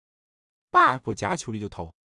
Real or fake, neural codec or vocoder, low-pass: fake; codec, 16 kHz in and 24 kHz out, 0.4 kbps, LongCat-Audio-Codec, two codebook decoder; 10.8 kHz